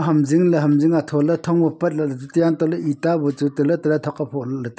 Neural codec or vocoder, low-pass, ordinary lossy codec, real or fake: none; none; none; real